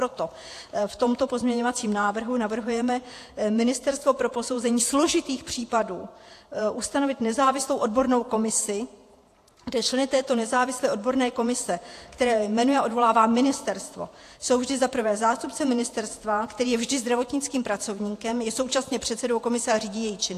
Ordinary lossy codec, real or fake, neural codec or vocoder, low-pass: AAC, 64 kbps; fake; vocoder, 48 kHz, 128 mel bands, Vocos; 14.4 kHz